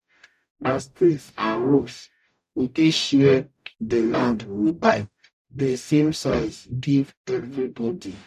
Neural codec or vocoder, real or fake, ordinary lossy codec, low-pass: codec, 44.1 kHz, 0.9 kbps, DAC; fake; none; 14.4 kHz